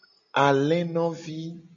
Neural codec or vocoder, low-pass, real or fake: none; 7.2 kHz; real